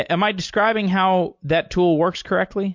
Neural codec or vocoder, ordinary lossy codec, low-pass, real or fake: none; MP3, 48 kbps; 7.2 kHz; real